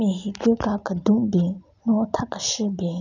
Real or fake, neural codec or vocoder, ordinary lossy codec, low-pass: real; none; none; 7.2 kHz